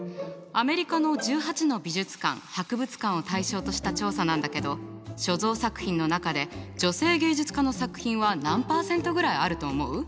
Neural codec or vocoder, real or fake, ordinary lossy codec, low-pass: none; real; none; none